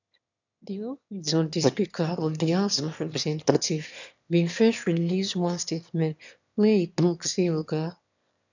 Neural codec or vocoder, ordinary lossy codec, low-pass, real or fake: autoencoder, 22.05 kHz, a latent of 192 numbers a frame, VITS, trained on one speaker; none; 7.2 kHz; fake